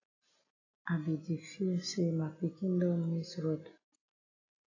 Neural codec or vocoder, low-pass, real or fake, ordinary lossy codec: none; 7.2 kHz; real; AAC, 32 kbps